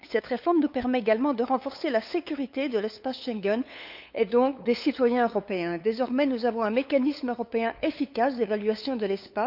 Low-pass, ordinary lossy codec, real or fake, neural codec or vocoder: 5.4 kHz; none; fake; codec, 16 kHz, 8 kbps, FunCodec, trained on LibriTTS, 25 frames a second